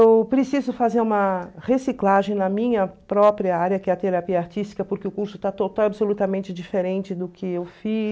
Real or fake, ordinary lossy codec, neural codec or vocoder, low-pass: real; none; none; none